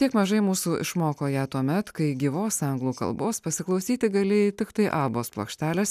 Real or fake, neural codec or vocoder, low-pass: real; none; 14.4 kHz